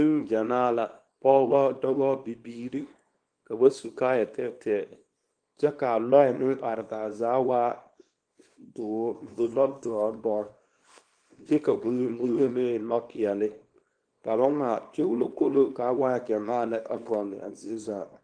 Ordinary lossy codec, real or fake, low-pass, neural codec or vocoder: Opus, 24 kbps; fake; 9.9 kHz; codec, 24 kHz, 0.9 kbps, WavTokenizer, small release